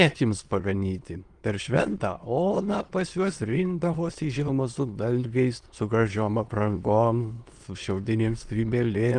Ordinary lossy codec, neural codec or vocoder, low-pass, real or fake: Opus, 24 kbps; autoencoder, 22.05 kHz, a latent of 192 numbers a frame, VITS, trained on many speakers; 9.9 kHz; fake